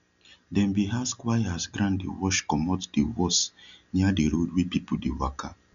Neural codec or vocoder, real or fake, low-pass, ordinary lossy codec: none; real; 7.2 kHz; none